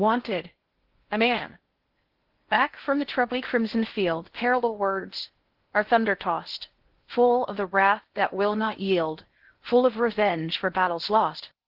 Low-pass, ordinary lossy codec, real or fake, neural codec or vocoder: 5.4 kHz; Opus, 16 kbps; fake; codec, 16 kHz in and 24 kHz out, 0.6 kbps, FocalCodec, streaming, 4096 codes